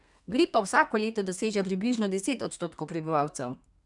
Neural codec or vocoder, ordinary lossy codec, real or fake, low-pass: codec, 32 kHz, 1.9 kbps, SNAC; none; fake; 10.8 kHz